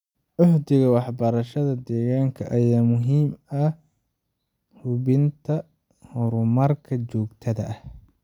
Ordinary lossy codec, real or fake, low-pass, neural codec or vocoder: none; real; 19.8 kHz; none